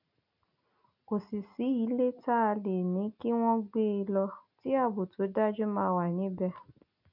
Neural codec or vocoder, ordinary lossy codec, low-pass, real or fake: none; none; 5.4 kHz; real